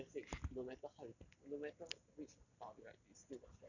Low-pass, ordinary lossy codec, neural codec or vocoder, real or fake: 7.2 kHz; none; none; real